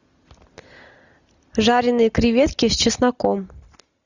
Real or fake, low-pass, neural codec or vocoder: real; 7.2 kHz; none